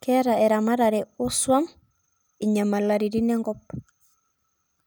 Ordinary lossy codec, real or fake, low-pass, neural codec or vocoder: none; real; none; none